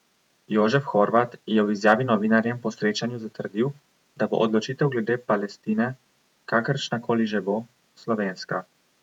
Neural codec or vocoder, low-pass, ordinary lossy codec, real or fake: vocoder, 48 kHz, 128 mel bands, Vocos; 19.8 kHz; none; fake